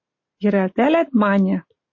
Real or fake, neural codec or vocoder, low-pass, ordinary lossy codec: real; none; 7.2 kHz; MP3, 32 kbps